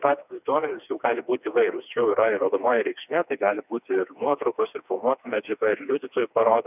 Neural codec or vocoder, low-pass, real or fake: codec, 16 kHz, 2 kbps, FreqCodec, smaller model; 3.6 kHz; fake